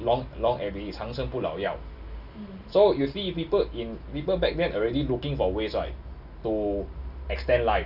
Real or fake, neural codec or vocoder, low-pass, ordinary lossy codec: real; none; 5.4 kHz; none